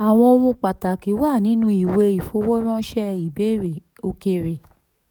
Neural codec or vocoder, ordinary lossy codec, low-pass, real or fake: codec, 44.1 kHz, 7.8 kbps, DAC; none; 19.8 kHz; fake